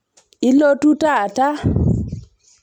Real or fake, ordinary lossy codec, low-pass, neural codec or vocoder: real; none; 19.8 kHz; none